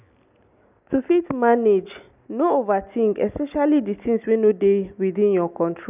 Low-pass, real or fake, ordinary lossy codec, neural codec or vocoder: 3.6 kHz; real; none; none